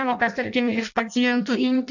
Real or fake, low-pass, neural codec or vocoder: fake; 7.2 kHz; codec, 16 kHz in and 24 kHz out, 0.6 kbps, FireRedTTS-2 codec